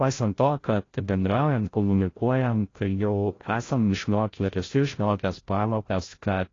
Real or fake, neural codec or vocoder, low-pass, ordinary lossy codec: fake; codec, 16 kHz, 0.5 kbps, FreqCodec, larger model; 7.2 kHz; AAC, 32 kbps